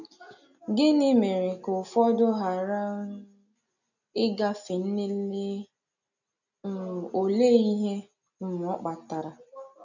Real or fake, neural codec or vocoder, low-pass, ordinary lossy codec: real; none; 7.2 kHz; none